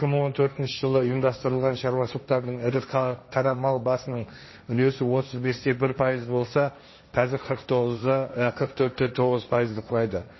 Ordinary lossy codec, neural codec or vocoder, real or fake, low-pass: MP3, 24 kbps; codec, 16 kHz, 1.1 kbps, Voila-Tokenizer; fake; 7.2 kHz